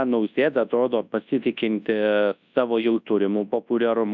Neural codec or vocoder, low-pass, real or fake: codec, 24 kHz, 0.9 kbps, WavTokenizer, large speech release; 7.2 kHz; fake